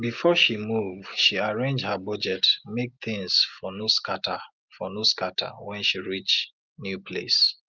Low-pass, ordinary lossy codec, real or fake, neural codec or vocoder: 7.2 kHz; Opus, 32 kbps; real; none